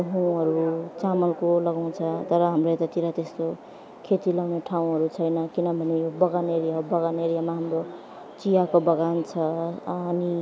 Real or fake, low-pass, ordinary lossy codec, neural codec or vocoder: real; none; none; none